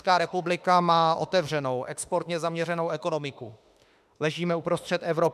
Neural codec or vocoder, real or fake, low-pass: autoencoder, 48 kHz, 32 numbers a frame, DAC-VAE, trained on Japanese speech; fake; 14.4 kHz